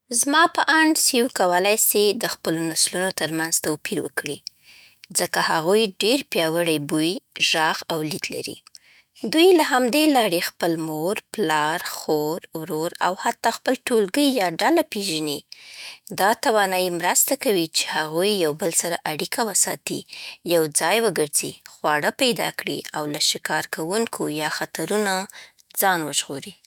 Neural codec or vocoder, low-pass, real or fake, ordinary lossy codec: autoencoder, 48 kHz, 128 numbers a frame, DAC-VAE, trained on Japanese speech; none; fake; none